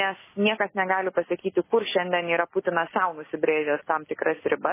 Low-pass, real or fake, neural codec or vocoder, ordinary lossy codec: 3.6 kHz; real; none; MP3, 16 kbps